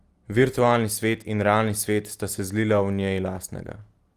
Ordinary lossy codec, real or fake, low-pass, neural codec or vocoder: Opus, 24 kbps; real; 14.4 kHz; none